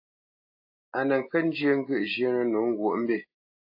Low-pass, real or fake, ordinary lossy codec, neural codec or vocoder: 5.4 kHz; real; AAC, 32 kbps; none